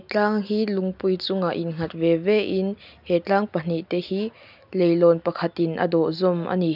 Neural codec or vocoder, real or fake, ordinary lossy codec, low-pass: none; real; none; 5.4 kHz